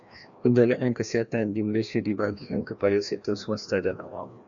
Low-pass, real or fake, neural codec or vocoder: 7.2 kHz; fake; codec, 16 kHz, 1 kbps, FreqCodec, larger model